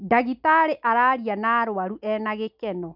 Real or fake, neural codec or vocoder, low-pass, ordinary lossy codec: real; none; 5.4 kHz; none